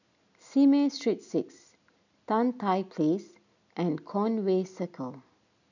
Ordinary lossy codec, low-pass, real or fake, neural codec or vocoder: none; 7.2 kHz; real; none